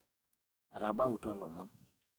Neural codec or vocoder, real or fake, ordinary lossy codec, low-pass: codec, 44.1 kHz, 2.6 kbps, DAC; fake; none; none